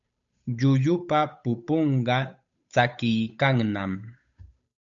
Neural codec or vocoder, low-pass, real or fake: codec, 16 kHz, 8 kbps, FunCodec, trained on Chinese and English, 25 frames a second; 7.2 kHz; fake